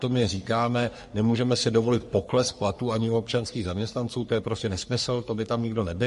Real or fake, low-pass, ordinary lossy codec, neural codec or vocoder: fake; 14.4 kHz; MP3, 48 kbps; codec, 44.1 kHz, 3.4 kbps, Pupu-Codec